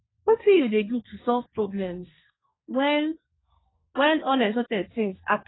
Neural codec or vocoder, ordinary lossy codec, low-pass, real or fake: codec, 32 kHz, 1.9 kbps, SNAC; AAC, 16 kbps; 7.2 kHz; fake